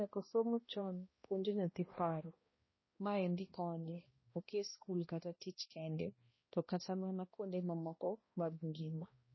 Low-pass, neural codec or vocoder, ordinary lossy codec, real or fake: 7.2 kHz; codec, 16 kHz, 1 kbps, X-Codec, HuBERT features, trained on balanced general audio; MP3, 24 kbps; fake